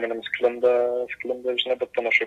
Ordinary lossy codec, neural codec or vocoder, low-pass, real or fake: AAC, 64 kbps; none; 14.4 kHz; real